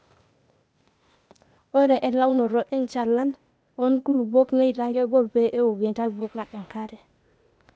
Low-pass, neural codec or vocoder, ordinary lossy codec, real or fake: none; codec, 16 kHz, 0.8 kbps, ZipCodec; none; fake